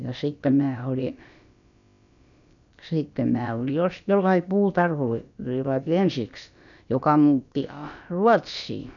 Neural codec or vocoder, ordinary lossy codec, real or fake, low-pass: codec, 16 kHz, about 1 kbps, DyCAST, with the encoder's durations; none; fake; 7.2 kHz